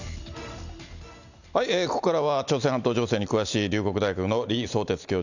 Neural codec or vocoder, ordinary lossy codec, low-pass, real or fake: none; none; 7.2 kHz; real